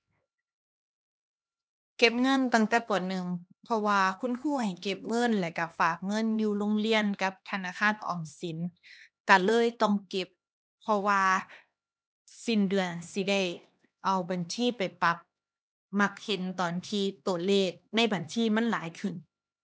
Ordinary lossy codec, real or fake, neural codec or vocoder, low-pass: none; fake; codec, 16 kHz, 2 kbps, X-Codec, HuBERT features, trained on LibriSpeech; none